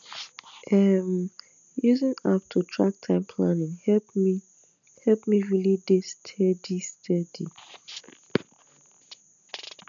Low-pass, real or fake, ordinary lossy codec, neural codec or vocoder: 7.2 kHz; real; none; none